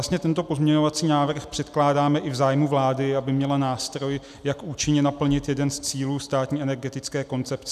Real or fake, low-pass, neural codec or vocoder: real; 14.4 kHz; none